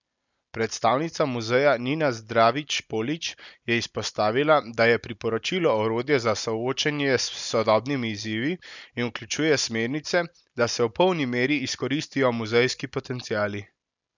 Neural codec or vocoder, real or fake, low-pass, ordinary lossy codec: none; real; 7.2 kHz; none